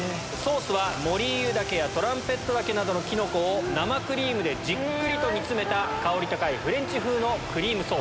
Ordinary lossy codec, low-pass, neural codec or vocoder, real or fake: none; none; none; real